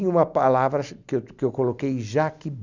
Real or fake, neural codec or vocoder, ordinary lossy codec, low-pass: real; none; Opus, 64 kbps; 7.2 kHz